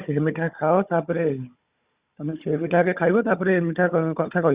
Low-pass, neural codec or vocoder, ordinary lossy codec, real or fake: 3.6 kHz; codec, 16 kHz, 16 kbps, FunCodec, trained on Chinese and English, 50 frames a second; Opus, 64 kbps; fake